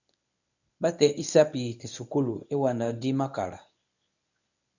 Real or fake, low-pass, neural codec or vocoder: fake; 7.2 kHz; codec, 24 kHz, 0.9 kbps, WavTokenizer, medium speech release version 1